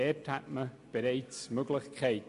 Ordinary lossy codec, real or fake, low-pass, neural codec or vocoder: AAC, 64 kbps; real; 10.8 kHz; none